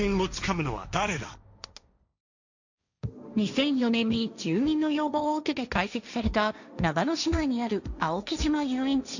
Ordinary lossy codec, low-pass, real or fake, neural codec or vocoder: none; 7.2 kHz; fake; codec, 16 kHz, 1.1 kbps, Voila-Tokenizer